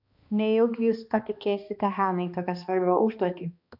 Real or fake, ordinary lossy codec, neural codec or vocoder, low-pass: fake; AAC, 48 kbps; codec, 16 kHz, 2 kbps, X-Codec, HuBERT features, trained on balanced general audio; 5.4 kHz